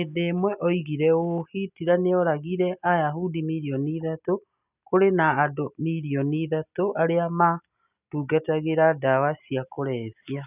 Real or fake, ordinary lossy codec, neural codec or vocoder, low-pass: real; none; none; 3.6 kHz